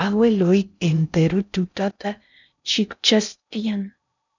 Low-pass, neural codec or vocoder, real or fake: 7.2 kHz; codec, 16 kHz in and 24 kHz out, 0.6 kbps, FocalCodec, streaming, 4096 codes; fake